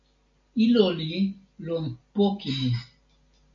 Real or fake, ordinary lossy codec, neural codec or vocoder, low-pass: real; MP3, 64 kbps; none; 7.2 kHz